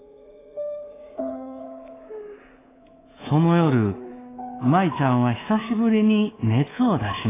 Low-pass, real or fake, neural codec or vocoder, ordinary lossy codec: 3.6 kHz; fake; codec, 24 kHz, 3.1 kbps, DualCodec; AAC, 16 kbps